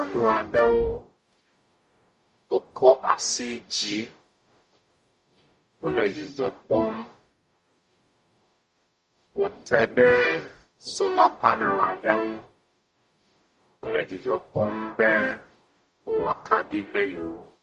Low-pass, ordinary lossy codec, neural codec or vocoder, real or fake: 14.4 kHz; MP3, 48 kbps; codec, 44.1 kHz, 0.9 kbps, DAC; fake